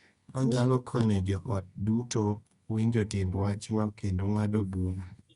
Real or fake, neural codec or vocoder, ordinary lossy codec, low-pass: fake; codec, 24 kHz, 0.9 kbps, WavTokenizer, medium music audio release; Opus, 64 kbps; 10.8 kHz